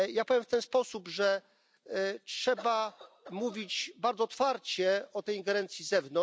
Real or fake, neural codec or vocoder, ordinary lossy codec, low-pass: real; none; none; none